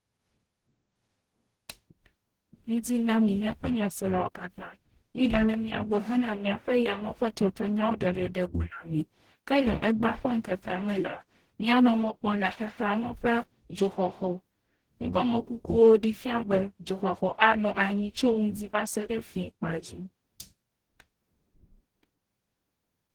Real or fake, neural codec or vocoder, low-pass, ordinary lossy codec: fake; codec, 44.1 kHz, 0.9 kbps, DAC; 19.8 kHz; Opus, 16 kbps